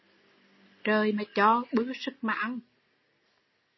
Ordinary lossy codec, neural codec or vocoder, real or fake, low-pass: MP3, 24 kbps; none; real; 7.2 kHz